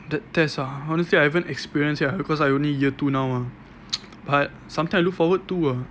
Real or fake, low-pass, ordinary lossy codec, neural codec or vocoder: real; none; none; none